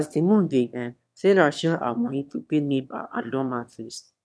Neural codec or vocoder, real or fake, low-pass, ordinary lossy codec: autoencoder, 22.05 kHz, a latent of 192 numbers a frame, VITS, trained on one speaker; fake; none; none